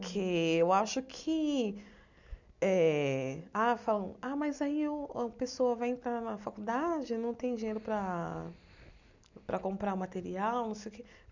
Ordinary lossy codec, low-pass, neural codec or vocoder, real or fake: none; 7.2 kHz; none; real